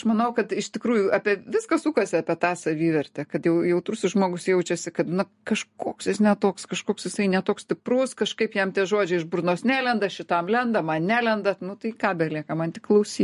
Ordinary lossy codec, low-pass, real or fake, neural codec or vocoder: MP3, 48 kbps; 14.4 kHz; real; none